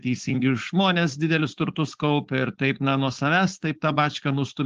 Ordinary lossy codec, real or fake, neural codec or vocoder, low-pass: Opus, 16 kbps; fake; codec, 16 kHz, 4.8 kbps, FACodec; 7.2 kHz